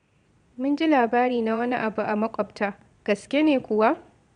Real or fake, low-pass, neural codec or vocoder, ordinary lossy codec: fake; 9.9 kHz; vocoder, 22.05 kHz, 80 mel bands, Vocos; MP3, 96 kbps